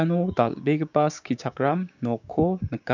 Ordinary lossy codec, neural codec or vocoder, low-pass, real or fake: none; codec, 16 kHz, 6 kbps, DAC; 7.2 kHz; fake